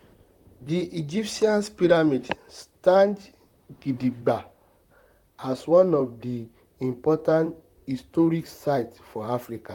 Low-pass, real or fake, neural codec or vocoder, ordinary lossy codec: 19.8 kHz; fake; vocoder, 44.1 kHz, 128 mel bands, Pupu-Vocoder; none